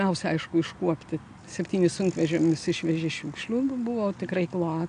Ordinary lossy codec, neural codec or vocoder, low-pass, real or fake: MP3, 96 kbps; none; 9.9 kHz; real